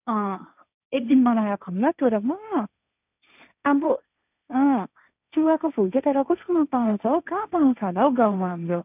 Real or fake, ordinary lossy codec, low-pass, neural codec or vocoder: fake; none; 3.6 kHz; codec, 16 kHz, 1.1 kbps, Voila-Tokenizer